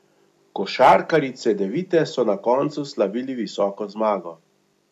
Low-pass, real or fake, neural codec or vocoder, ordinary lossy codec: 14.4 kHz; real; none; AAC, 96 kbps